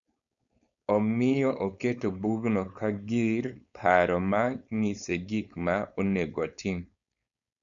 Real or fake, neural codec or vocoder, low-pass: fake; codec, 16 kHz, 4.8 kbps, FACodec; 7.2 kHz